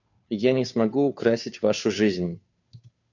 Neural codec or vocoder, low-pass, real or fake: codec, 16 kHz, 2 kbps, FunCodec, trained on Chinese and English, 25 frames a second; 7.2 kHz; fake